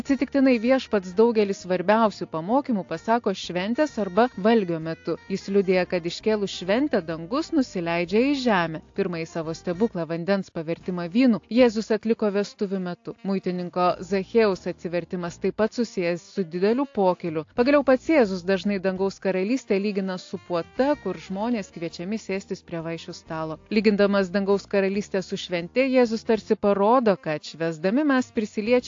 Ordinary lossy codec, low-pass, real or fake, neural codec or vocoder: AAC, 48 kbps; 7.2 kHz; real; none